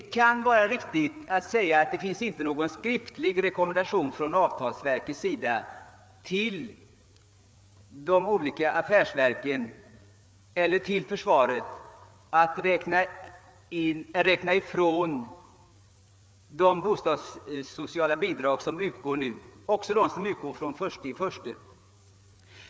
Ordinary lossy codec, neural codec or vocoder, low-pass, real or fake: none; codec, 16 kHz, 4 kbps, FreqCodec, larger model; none; fake